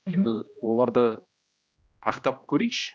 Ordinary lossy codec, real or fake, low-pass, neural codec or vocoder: none; fake; none; codec, 16 kHz, 1 kbps, X-Codec, HuBERT features, trained on general audio